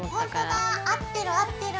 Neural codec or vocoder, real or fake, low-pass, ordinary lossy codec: none; real; none; none